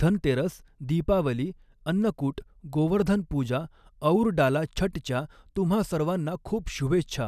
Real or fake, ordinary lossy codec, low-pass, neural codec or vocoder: real; none; 14.4 kHz; none